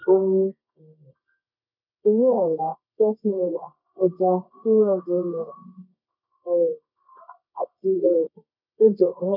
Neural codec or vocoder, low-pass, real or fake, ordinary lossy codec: codec, 24 kHz, 0.9 kbps, WavTokenizer, medium music audio release; 5.4 kHz; fake; none